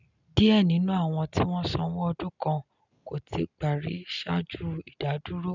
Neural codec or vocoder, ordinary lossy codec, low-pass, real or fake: none; none; 7.2 kHz; real